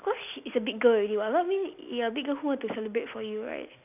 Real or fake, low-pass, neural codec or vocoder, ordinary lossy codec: real; 3.6 kHz; none; none